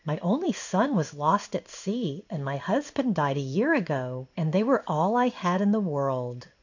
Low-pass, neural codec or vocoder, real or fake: 7.2 kHz; codec, 16 kHz in and 24 kHz out, 1 kbps, XY-Tokenizer; fake